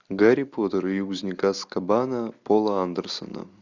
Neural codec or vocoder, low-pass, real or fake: none; 7.2 kHz; real